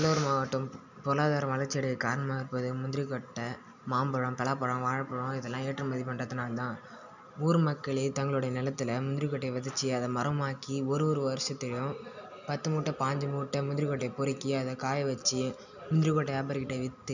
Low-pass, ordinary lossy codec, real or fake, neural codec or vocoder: 7.2 kHz; none; real; none